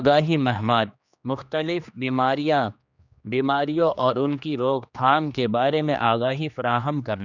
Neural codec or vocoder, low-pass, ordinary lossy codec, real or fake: codec, 16 kHz, 2 kbps, X-Codec, HuBERT features, trained on general audio; 7.2 kHz; none; fake